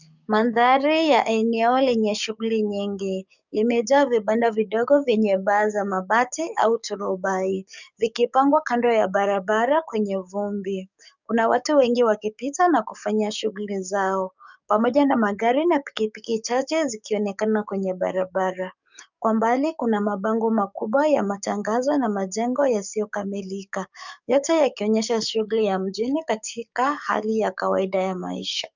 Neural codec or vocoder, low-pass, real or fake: codec, 44.1 kHz, 7.8 kbps, DAC; 7.2 kHz; fake